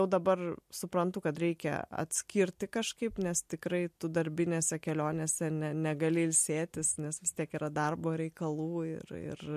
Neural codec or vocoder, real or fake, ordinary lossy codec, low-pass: none; real; MP3, 64 kbps; 14.4 kHz